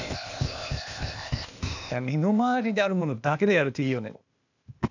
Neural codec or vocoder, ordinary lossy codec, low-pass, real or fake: codec, 16 kHz, 0.8 kbps, ZipCodec; none; 7.2 kHz; fake